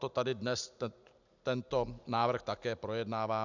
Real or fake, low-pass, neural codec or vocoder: real; 7.2 kHz; none